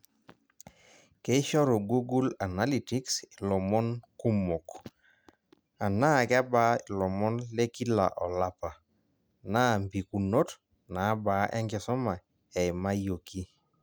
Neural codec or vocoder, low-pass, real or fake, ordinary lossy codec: none; none; real; none